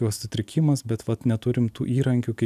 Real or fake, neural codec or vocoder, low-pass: real; none; 14.4 kHz